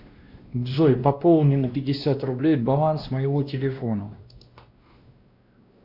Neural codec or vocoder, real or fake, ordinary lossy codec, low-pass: codec, 16 kHz, 1 kbps, X-Codec, WavLM features, trained on Multilingual LibriSpeech; fake; Opus, 64 kbps; 5.4 kHz